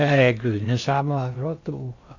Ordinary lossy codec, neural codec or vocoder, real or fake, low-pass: AAC, 48 kbps; codec, 16 kHz in and 24 kHz out, 0.6 kbps, FocalCodec, streaming, 2048 codes; fake; 7.2 kHz